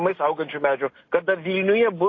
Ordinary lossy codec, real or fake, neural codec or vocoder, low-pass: AAC, 32 kbps; real; none; 7.2 kHz